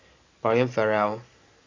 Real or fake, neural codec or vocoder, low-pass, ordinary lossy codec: real; none; 7.2 kHz; AAC, 32 kbps